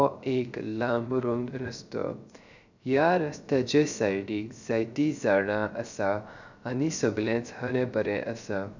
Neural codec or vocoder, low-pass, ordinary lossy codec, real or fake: codec, 16 kHz, 0.3 kbps, FocalCodec; 7.2 kHz; none; fake